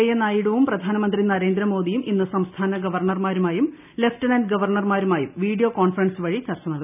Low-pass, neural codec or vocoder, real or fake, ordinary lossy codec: 3.6 kHz; none; real; none